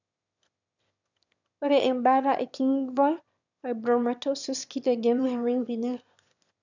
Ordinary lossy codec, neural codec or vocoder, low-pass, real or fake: none; autoencoder, 22.05 kHz, a latent of 192 numbers a frame, VITS, trained on one speaker; 7.2 kHz; fake